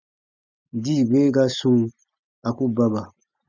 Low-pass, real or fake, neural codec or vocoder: 7.2 kHz; real; none